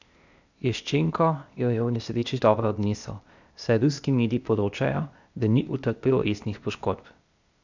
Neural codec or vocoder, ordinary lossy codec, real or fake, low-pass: codec, 16 kHz, 0.8 kbps, ZipCodec; none; fake; 7.2 kHz